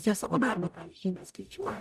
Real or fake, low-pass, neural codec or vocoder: fake; 14.4 kHz; codec, 44.1 kHz, 0.9 kbps, DAC